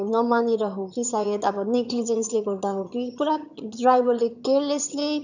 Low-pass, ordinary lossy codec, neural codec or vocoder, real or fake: 7.2 kHz; none; vocoder, 22.05 kHz, 80 mel bands, HiFi-GAN; fake